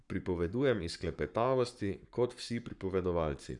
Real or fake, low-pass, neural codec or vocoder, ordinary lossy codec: fake; 10.8 kHz; codec, 44.1 kHz, 7.8 kbps, Pupu-Codec; none